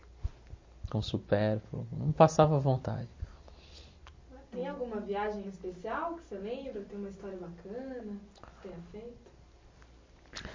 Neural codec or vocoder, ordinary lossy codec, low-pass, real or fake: none; MP3, 32 kbps; 7.2 kHz; real